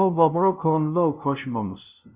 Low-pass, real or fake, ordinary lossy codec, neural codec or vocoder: 3.6 kHz; fake; Opus, 64 kbps; codec, 16 kHz, 0.5 kbps, FunCodec, trained on LibriTTS, 25 frames a second